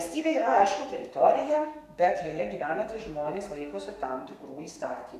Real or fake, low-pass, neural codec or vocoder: fake; 14.4 kHz; codec, 32 kHz, 1.9 kbps, SNAC